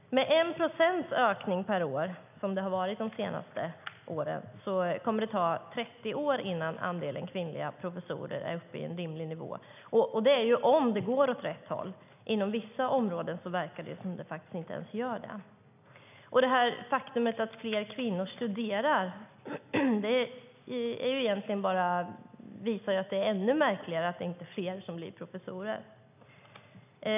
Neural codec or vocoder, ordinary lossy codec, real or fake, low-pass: none; none; real; 3.6 kHz